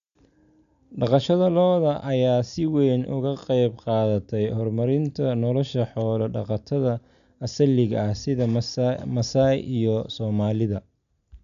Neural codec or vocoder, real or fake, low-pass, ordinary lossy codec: none; real; 7.2 kHz; none